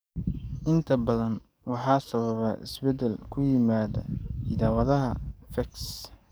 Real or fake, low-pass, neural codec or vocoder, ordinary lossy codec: fake; none; codec, 44.1 kHz, 7.8 kbps, DAC; none